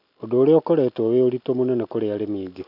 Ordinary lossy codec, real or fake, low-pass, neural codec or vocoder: none; real; 5.4 kHz; none